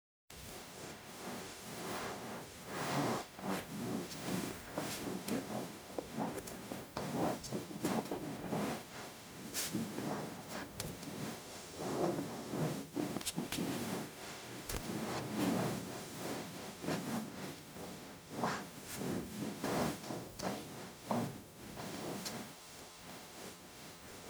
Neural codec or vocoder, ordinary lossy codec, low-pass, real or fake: codec, 44.1 kHz, 0.9 kbps, DAC; none; none; fake